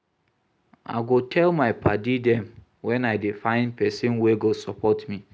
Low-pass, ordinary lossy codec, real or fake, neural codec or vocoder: none; none; real; none